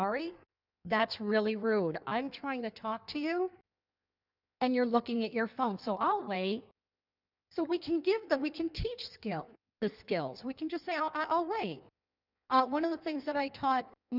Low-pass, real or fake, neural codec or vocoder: 5.4 kHz; fake; codec, 16 kHz in and 24 kHz out, 1.1 kbps, FireRedTTS-2 codec